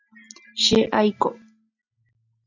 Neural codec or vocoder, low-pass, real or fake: none; 7.2 kHz; real